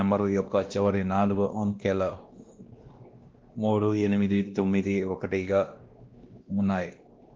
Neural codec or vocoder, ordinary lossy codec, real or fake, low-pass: codec, 16 kHz, 1 kbps, X-Codec, WavLM features, trained on Multilingual LibriSpeech; Opus, 16 kbps; fake; 7.2 kHz